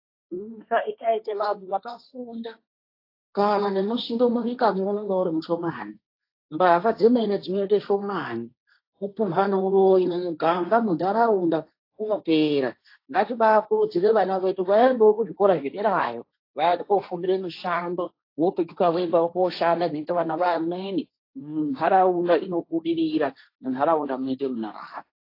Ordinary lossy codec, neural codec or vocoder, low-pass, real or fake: AAC, 32 kbps; codec, 16 kHz, 1.1 kbps, Voila-Tokenizer; 5.4 kHz; fake